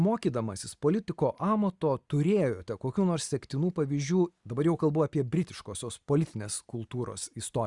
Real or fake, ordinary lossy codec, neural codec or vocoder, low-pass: real; Opus, 64 kbps; none; 10.8 kHz